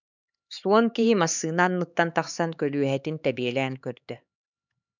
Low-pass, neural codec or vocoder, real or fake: 7.2 kHz; codec, 16 kHz, 4 kbps, X-Codec, HuBERT features, trained on LibriSpeech; fake